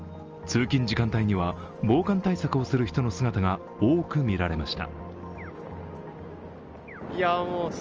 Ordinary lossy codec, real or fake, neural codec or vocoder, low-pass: Opus, 24 kbps; real; none; 7.2 kHz